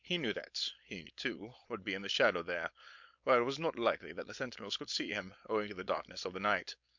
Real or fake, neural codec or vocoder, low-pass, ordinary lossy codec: fake; codec, 16 kHz, 4.8 kbps, FACodec; 7.2 kHz; MP3, 64 kbps